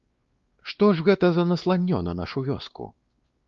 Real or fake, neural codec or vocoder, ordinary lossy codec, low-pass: fake; codec, 16 kHz, 2 kbps, X-Codec, WavLM features, trained on Multilingual LibriSpeech; Opus, 32 kbps; 7.2 kHz